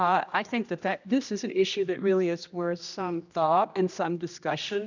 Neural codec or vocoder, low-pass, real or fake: codec, 16 kHz, 1 kbps, X-Codec, HuBERT features, trained on general audio; 7.2 kHz; fake